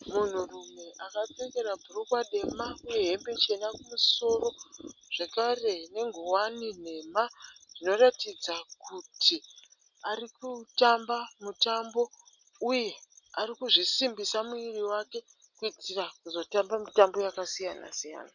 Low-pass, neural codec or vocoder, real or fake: 7.2 kHz; none; real